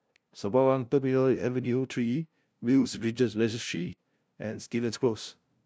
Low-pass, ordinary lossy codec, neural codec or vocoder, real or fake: none; none; codec, 16 kHz, 0.5 kbps, FunCodec, trained on LibriTTS, 25 frames a second; fake